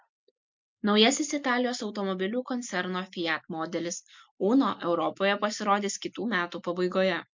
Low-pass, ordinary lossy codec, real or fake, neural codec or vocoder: 7.2 kHz; MP3, 48 kbps; real; none